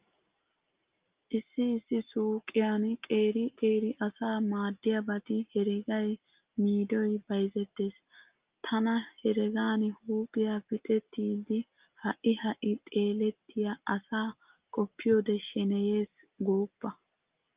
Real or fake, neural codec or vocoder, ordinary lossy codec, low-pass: real; none; Opus, 24 kbps; 3.6 kHz